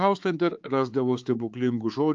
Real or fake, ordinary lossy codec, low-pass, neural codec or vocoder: fake; Opus, 24 kbps; 7.2 kHz; codec, 16 kHz, 4 kbps, X-Codec, HuBERT features, trained on balanced general audio